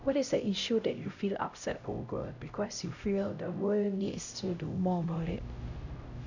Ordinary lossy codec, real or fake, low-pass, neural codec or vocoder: none; fake; 7.2 kHz; codec, 16 kHz, 0.5 kbps, X-Codec, HuBERT features, trained on LibriSpeech